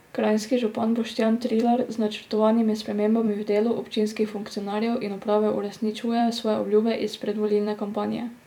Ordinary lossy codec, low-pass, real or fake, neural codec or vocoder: none; 19.8 kHz; fake; vocoder, 44.1 kHz, 128 mel bands every 256 samples, BigVGAN v2